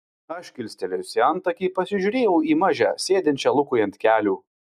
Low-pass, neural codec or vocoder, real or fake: 14.4 kHz; none; real